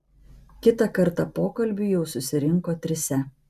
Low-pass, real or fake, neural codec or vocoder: 14.4 kHz; real; none